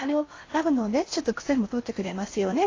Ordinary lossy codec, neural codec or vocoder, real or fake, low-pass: AAC, 32 kbps; codec, 16 kHz in and 24 kHz out, 0.8 kbps, FocalCodec, streaming, 65536 codes; fake; 7.2 kHz